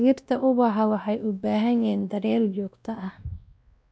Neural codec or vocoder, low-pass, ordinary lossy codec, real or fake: codec, 16 kHz, 1 kbps, X-Codec, WavLM features, trained on Multilingual LibriSpeech; none; none; fake